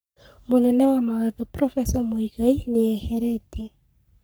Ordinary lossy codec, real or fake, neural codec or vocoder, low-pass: none; fake; codec, 44.1 kHz, 3.4 kbps, Pupu-Codec; none